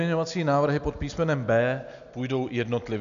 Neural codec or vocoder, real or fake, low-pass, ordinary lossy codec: none; real; 7.2 kHz; MP3, 96 kbps